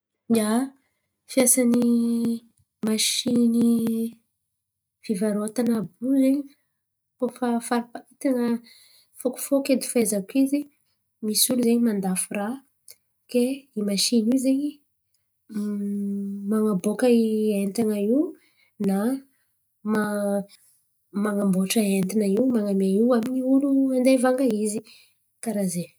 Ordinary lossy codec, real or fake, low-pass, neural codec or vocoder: none; real; none; none